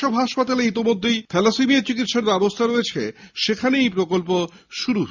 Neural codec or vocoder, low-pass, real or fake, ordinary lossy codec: none; 7.2 kHz; real; Opus, 64 kbps